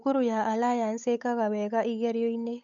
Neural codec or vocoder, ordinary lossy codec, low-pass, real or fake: codec, 16 kHz, 8 kbps, FunCodec, trained on Chinese and English, 25 frames a second; none; 7.2 kHz; fake